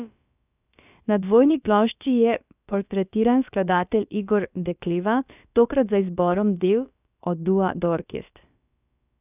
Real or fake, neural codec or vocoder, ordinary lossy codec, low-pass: fake; codec, 16 kHz, about 1 kbps, DyCAST, with the encoder's durations; none; 3.6 kHz